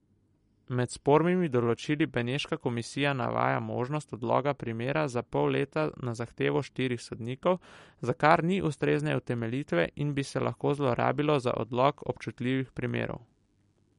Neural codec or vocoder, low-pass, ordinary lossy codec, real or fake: none; 19.8 kHz; MP3, 48 kbps; real